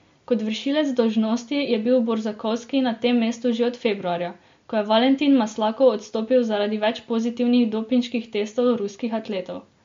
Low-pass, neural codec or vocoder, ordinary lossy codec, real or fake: 7.2 kHz; none; MP3, 48 kbps; real